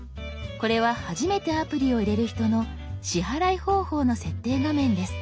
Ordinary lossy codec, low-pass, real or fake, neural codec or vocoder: none; none; real; none